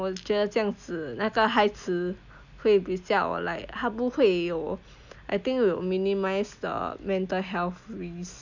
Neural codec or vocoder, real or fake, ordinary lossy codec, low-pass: none; real; none; 7.2 kHz